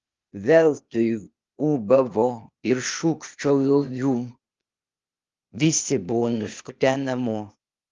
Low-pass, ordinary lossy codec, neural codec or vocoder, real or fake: 7.2 kHz; Opus, 32 kbps; codec, 16 kHz, 0.8 kbps, ZipCodec; fake